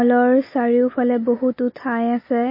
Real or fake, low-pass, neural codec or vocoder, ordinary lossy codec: real; 5.4 kHz; none; MP3, 24 kbps